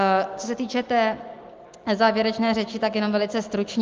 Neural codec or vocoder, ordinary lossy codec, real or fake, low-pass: none; Opus, 32 kbps; real; 7.2 kHz